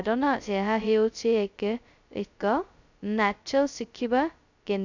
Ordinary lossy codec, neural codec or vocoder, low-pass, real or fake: none; codec, 16 kHz, 0.2 kbps, FocalCodec; 7.2 kHz; fake